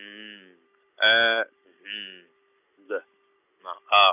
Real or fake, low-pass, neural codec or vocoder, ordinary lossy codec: real; 3.6 kHz; none; none